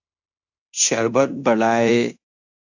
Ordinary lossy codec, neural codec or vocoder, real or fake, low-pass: AAC, 48 kbps; codec, 16 kHz in and 24 kHz out, 0.9 kbps, LongCat-Audio-Codec, fine tuned four codebook decoder; fake; 7.2 kHz